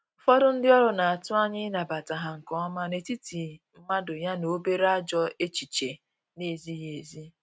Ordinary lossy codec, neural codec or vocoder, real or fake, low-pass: none; none; real; none